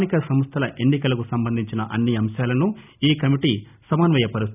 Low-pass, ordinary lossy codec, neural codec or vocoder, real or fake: 3.6 kHz; none; none; real